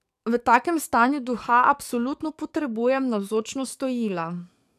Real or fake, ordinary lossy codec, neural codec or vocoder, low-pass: fake; none; codec, 44.1 kHz, 7.8 kbps, DAC; 14.4 kHz